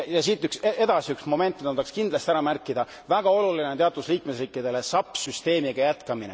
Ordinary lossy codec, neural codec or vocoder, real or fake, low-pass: none; none; real; none